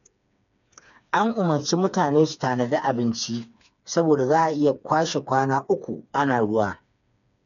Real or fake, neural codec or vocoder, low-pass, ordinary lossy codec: fake; codec, 16 kHz, 4 kbps, FreqCodec, smaller model; 7.2 kHz; none